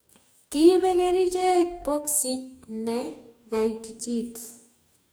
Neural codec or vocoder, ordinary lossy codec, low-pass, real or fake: codec, 44.1 kHz, 2.6 kbps, DAC; none; none; fake